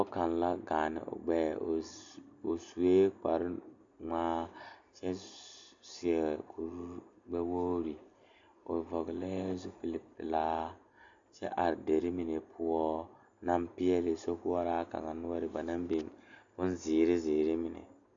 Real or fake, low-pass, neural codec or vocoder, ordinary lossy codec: real; 7.2 kHz; none; AAC, 64 kbps